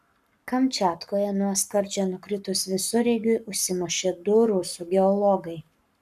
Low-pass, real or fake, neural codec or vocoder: 14.4 kHz; fake; codec, 44.1 kHz, 7.8 kbps, Pupu-Codec